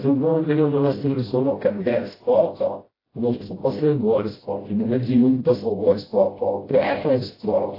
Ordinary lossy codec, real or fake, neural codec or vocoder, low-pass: AAC, 24 kbps; fake; codec, 16 kHz, 0.5 kbps, FreqCodec, smaller model; 5.4 kHz